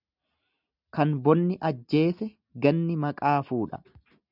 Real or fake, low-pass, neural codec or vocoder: real; 5.4 kHz; none